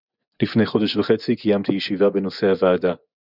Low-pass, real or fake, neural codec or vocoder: 5.4 kHz; fake; vocoder, 24 kHz, 100 mel bands, Vocos